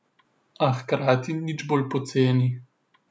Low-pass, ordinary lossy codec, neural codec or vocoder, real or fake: none; none; none; real